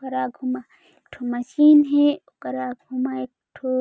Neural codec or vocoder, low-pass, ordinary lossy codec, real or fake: none; none; none; real